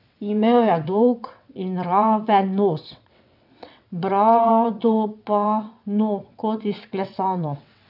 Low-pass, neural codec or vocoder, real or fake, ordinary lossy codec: 5.4 kHz; vocoder, 22.05 kHz, 80 mel bands, WaveNeXt; fake; none